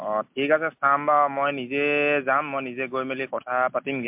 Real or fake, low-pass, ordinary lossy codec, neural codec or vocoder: real; 3.6 kHz; MP3, 32 kbps; none